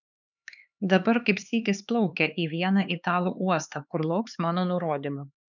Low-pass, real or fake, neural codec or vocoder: 7.2 kHz; fake; codec, 16 kHz, 4 kbps, X-Codec, HuBERT features, trained on LibriSpeech